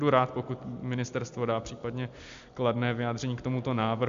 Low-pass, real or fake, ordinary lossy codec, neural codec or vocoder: 7.2 kHz; real; MP3, 64 kbps; none